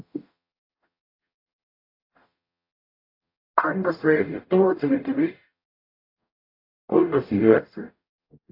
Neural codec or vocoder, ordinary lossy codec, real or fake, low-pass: codec, 44.1 kHz, 0.9 kbps, DAC; AAC, 32 kbps; fake; 5.4 kHz